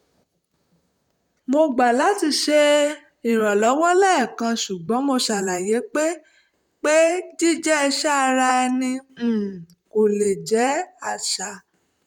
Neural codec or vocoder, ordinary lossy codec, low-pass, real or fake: vocoder, 44.1 kHz, 128 mel bands, Pupu-Vocoder; none; 19.8 kHz; fake